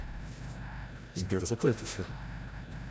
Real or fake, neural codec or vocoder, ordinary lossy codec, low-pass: fake; codec, 16 kHz, 0.5 kbps, FreqCodec, larger model; none; none